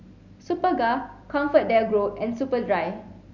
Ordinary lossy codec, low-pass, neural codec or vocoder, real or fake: Opus, 64 kbps; 7.2 kHz; none; real